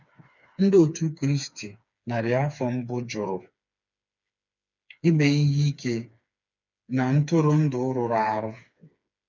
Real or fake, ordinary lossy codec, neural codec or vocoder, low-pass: fake; none; codec, 16 kHz, 4 kbps, FreqCodec, smaller model; 7.2 kHz